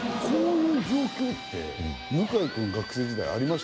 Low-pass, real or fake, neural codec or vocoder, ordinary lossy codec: none; real; none; none